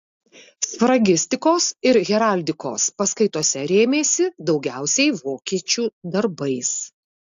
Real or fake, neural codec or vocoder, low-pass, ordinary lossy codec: real; none; 7.2 kHz; AAC, 64 kbps